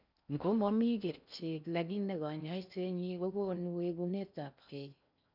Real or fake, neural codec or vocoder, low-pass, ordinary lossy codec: fake; codec, 16 kHz in and 24 kHz out, 0.6 kbps, FocalCodec, streaming, 4096 codes; 5.4 kHz; none